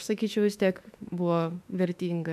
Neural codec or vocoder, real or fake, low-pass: autoencoder, 48 kHz, 32 numbers a frame, DAC-VAE, trained on Japanese speech; fake; 14.4 kHz